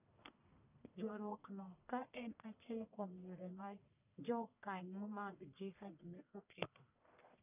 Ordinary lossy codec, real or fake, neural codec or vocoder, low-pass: none; fake; codec, 44.1 kHz, 1.7 kbps, Pupu-Codec; 3.6 kHz